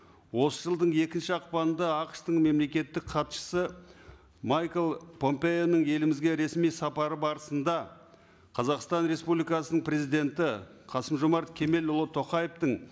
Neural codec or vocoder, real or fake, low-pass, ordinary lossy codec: none; real; none; none